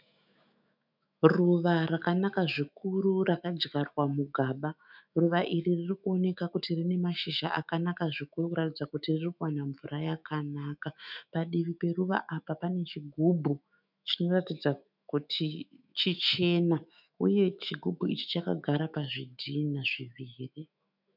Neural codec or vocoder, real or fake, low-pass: autoencoder, 48 kHz, 128 numbers a frame, DAC-VAE, trained on Japanese speech; fake; 5.4 kHz